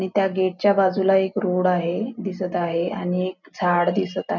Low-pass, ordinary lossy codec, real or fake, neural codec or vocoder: 7.2 kHz; none; real; none